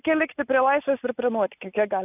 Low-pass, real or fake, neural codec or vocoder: 3.6 kHz; real; none